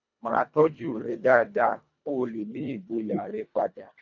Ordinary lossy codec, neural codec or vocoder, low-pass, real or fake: none; codec, 24 kHz, 1.5 kbps, HILCodec; 7.2 kHz; fake